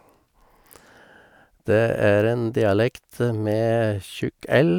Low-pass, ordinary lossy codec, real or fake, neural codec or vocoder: 19.8 kHz; none; real; none